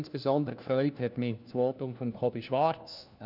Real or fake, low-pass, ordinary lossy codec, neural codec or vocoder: fake; 5.4 kHz; none; codec, 16 kHz, 1 kbps, FunCodec, trained on LibriTTS, 50 frames a second